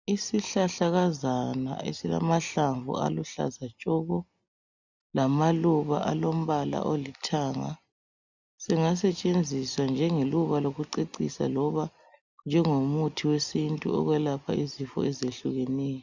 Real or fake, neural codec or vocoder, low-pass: real; none; 7.2 kHz